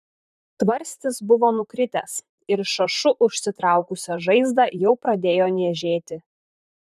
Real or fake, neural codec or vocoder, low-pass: fake; vocoder, 44.1 kHz, 128 mel bands, Pupu-Vocoder; 14.4 kHz